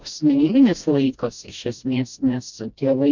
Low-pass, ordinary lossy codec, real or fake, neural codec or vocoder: 7.2 kHz; MP3, 64 kbps; fake; codec, 16 kHz, 1 kbps, FreqCodec, smaller model